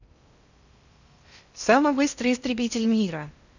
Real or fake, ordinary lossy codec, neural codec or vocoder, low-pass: fake; none; codec, 16 kHz in and 24 kHz out, 0.6 kbps, FocalCodec, streaming, 2048 codes; 7.2 kHz